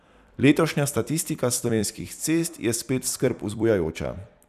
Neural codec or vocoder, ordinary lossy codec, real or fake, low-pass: vocoder, 44.1 kHz, 128 mel bands every 256 samples, BigVGAN v2; none; fake; 14.4 kHz